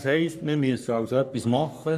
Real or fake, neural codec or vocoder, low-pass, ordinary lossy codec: fake; codec, 44.1 kHz, 3.4 kbps, Pupu-Codec; 14.4 kHz; none